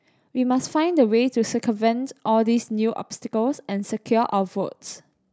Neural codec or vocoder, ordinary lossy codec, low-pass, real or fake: none; none; none; real